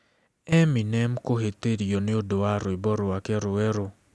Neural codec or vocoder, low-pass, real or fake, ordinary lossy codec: none; none; real; none